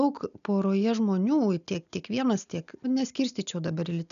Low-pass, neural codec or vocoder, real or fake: 7.2 kHz; none; real